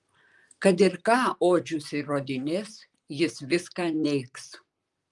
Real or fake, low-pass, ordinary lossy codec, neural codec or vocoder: fake; 10.8 kHz; Opus, 32 kbps; vocoder, 44.1 kHz, 128 mel bands, Pupu-Vocoder